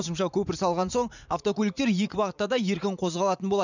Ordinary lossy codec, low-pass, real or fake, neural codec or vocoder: none; 7.2 kHz; fake; vocoder, 44.1 kHz, 80 mel bands, Vocos